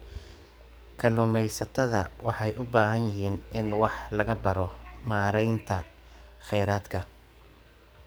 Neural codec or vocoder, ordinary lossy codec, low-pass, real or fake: codec, 44.1 kHz, 2.6 kbps, SNAC; none; none; fake